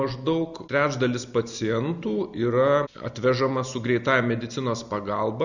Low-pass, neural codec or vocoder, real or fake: 7.2 kHz; none; real